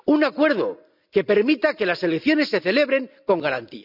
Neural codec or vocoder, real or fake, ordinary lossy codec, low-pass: none; real; none; 5.4 kHz